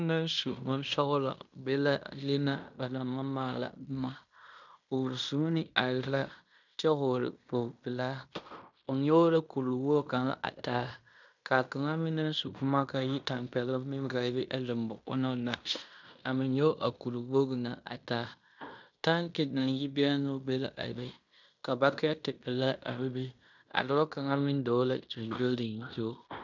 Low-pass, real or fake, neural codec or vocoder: 7.2 kHz; fake; codec, 16 kHz in and 24 kHz out, 0.9 kbps, LongCat-Audio-Codec, fine tuned four codebook decoder